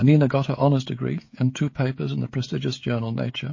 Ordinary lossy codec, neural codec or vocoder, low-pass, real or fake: MP3, 32 kbps; codec, 16 kHz, 16 kbps, FreqCodec, smaller model; 7.2 kHz; fake